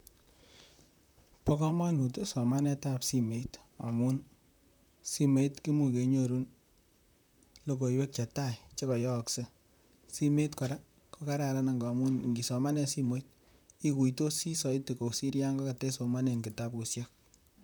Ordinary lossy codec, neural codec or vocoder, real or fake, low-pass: none; vocoder, 44.1 kHz, 128 mel bands, Pupu-Vocoder; fake; none